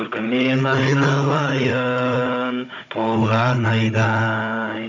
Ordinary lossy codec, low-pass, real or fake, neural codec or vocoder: none; 7.2 kHz; fake; codec, 16 kHz, 16 kbps, FunCodec, trained on Chinese and English, 50 frames a second